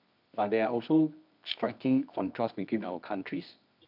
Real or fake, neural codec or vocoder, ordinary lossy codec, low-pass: fake; codec, 24 kHz, 0.9 kbps, WavTokenizer, medium music audio release; none; 5.4 kHz